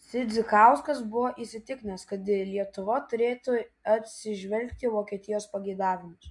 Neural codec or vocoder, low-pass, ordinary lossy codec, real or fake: none; 10.8 kHz; MP3, 64 kbps; real